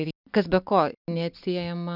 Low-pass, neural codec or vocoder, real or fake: 5.4 kHz; none; real